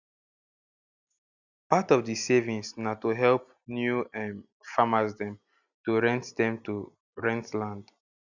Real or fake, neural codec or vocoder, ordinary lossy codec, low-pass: real; none; none; 7.2 kHz